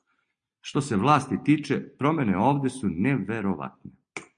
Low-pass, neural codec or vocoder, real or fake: 9.9 kHz; none; real